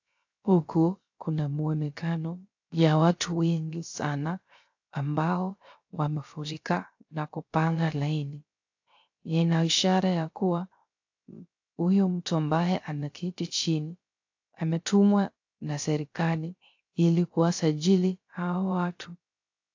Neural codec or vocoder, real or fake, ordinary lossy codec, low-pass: codec, 16 kHz, 0.3 kbps, FocalCodec; fake; AAC, 48 kbps; 7.2 kHz